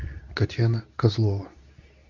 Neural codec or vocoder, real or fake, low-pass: codec, 24 kHz, 0.9 kbps, WavTokenizer, medium speech release version 2; fake; 7.2 kHz